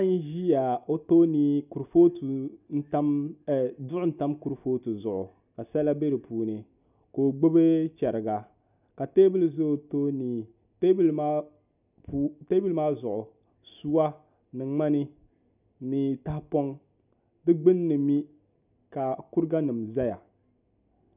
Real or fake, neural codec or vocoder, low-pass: real; none; 3.6 kHz